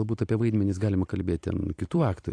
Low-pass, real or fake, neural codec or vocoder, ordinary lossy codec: 9.9 kHz; fake; vocoder, 44.1 kHz, 128 mel bands every 256 samples, BigVGAN v2; AAC, 48 kbps